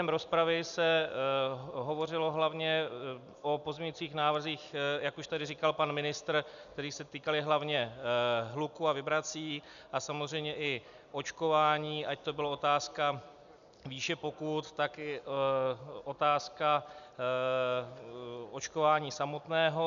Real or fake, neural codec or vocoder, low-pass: real; none; 7.2 kHz